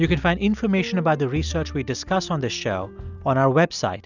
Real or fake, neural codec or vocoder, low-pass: real; none; 7.2 kHz